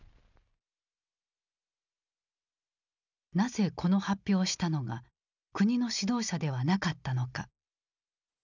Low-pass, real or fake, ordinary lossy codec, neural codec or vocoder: 7.2 kHz; real; none; none